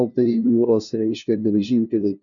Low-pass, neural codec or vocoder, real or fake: 7.2 kHz; codec, 16 kHz, 0.5 kbps, FunCodec, trained on LibriTTS, 25 frames a second; fake